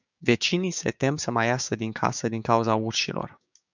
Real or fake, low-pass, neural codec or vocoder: fake; 7.2 kHz; codec, 16 kHz, 6 kbps, DAC